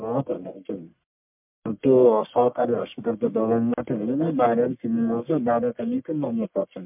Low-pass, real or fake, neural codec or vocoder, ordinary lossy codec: 3.6 kHz; fake; codec, 44.1 kHz, 1.7 kbps, Pupu-Codec; MP3, 32 kbps